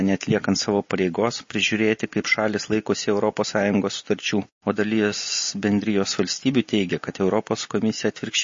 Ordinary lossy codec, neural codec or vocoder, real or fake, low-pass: MP3, 32 kbps; none; real; 7.2 kHz